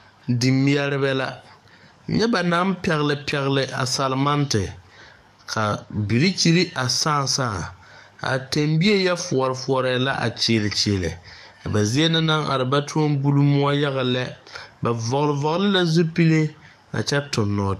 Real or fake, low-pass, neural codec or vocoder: fake; 14.4 kHz; codec, 44.1 kHz, 7.8 kbps, DAC